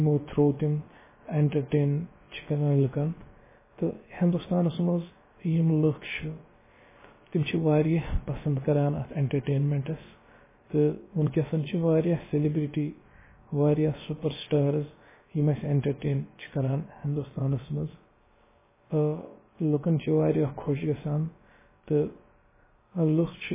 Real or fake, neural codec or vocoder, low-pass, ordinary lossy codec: fake; codec, 16 kHz, about 1 kbps, DyCAST, with the encoder's durations; 3.6 kHz; MP3, 16 kbps